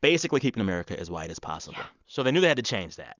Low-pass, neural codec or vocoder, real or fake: 7.2 kHz; none; real